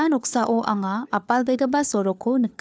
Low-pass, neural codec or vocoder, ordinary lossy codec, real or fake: none; codec, 16 kHz, 4 kbps, FunCodec, trained on LibriTTS, 50 frames a second; none; fake